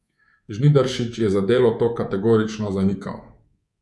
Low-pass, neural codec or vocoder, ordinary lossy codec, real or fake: none; codec, 24 kHz, 3.1 kbps, DualCodec; none; fake